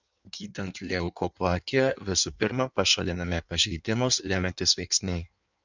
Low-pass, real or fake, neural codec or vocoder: 7.2 kHz; fake; codec, 16 kHz in and 24 kHz out, 1.1 kbps, FireRedTTS-2 codec